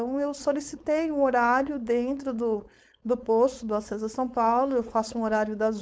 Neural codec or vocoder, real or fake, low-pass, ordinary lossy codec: codec, 16 kHz, 4.8 kbps, FACodec; fake; none; none